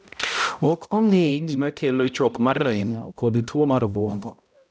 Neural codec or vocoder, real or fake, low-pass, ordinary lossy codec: codec, 16 kHz, 0.5 kbps, X-Codec, HuBERT features, trained on balanced general audio; fake; none; none